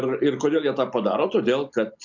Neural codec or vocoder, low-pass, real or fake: none; 7.2 kHz; real